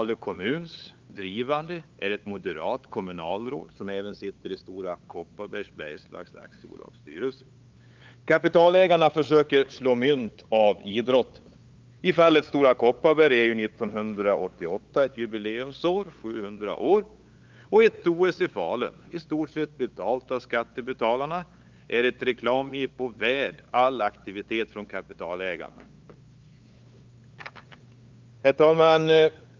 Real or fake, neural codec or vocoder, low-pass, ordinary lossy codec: fake; codec, 16 kHz, 8 kbps, FunCodec, trained on LibriTTS, 25 frames a second; 7.2 kHz; Opus, 24 kbps